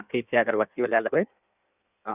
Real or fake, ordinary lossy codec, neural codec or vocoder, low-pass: fake; none; codec, 16 kHz in and 24 kHz out, 1.1 kbps, FireRedTTS-2 codec; 3.6 kHz